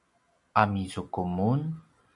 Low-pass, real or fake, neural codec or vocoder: 10.8 kHz; real; none